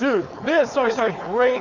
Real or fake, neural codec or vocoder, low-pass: fake; codec, 16 kHz, 4.8 kbps, FACodec; 7.2 kHz